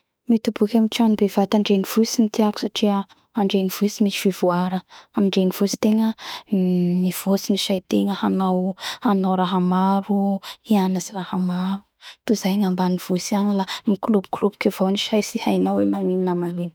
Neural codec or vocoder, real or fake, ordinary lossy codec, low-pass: autoencoder, 48 kHz, 32 numbers a frame, DAC-VAE, trained on Japanese speech; fake; none; none